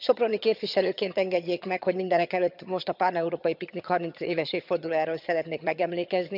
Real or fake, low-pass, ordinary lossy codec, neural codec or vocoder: fake; 5.4 kHz; none; vocoder, 22.05 kHz, 80 mel bands, HiFi-GAN